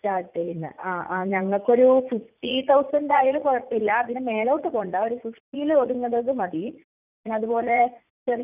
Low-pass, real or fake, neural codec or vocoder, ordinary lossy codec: 3.6 kHz; fake; vocoder, 44.1 kHz, 80 mel bands, Vocos; none